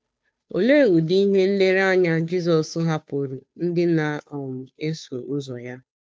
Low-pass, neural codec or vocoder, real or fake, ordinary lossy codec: none; codec, 16 kHz, 2 kbps, FunCodec, trained on Chinese and English, 25 frames a second; fake; none